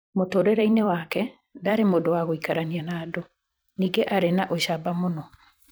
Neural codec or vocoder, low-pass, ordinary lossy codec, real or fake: vocoder, 44.1 kHz, 128 mel bands every 256 samples, BigVGAN v2; none; none; fake